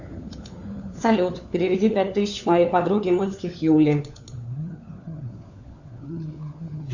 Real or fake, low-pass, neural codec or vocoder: fake; 7.2 kHz; codec, 16 kHz, 4 kbps, FunCodec, trained on LibriTTS, 50 frames a second